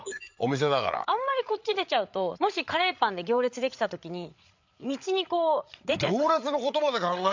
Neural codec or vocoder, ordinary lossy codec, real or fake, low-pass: codec, 16 kHz, 8 kbps, FreqCodec, larger model; MP3, 64 kbps; fake; 7.2 kHz